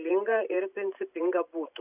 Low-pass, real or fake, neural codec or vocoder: 3.6 kHz; fake; vocoder, 44.1 kHz, 128 mel bands every 512 samples, BigVGAN v2